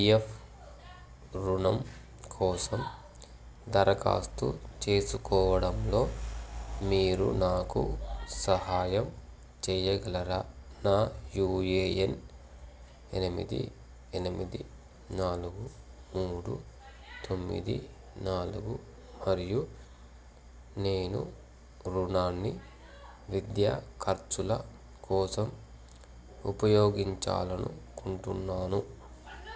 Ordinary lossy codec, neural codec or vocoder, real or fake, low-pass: none; none; real; none